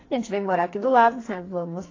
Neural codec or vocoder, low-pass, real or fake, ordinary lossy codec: codec, 44.1 kHz, 2.6 kbps, SNAC; 7.2 kHz; fake; AAC, 32 kbps